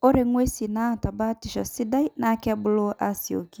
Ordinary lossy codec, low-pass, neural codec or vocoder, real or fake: none; none; none; real